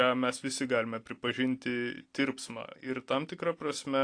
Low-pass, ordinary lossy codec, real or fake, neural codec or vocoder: 9.9 kHz; AAC, 48 kbps; real; none